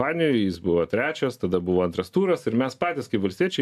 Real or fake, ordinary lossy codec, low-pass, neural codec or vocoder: real; AAC, 96 kbps; 14.4 kHz; none